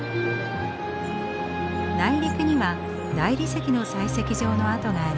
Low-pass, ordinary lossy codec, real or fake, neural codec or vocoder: none; none; real; none